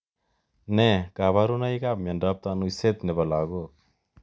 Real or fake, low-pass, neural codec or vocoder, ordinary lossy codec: real; none; none; none